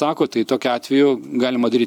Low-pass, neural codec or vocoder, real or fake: 19.8 kHz; vocoder, 44.1 kHz, 128 mel bands every 256 samples, BigVGAN v2; fake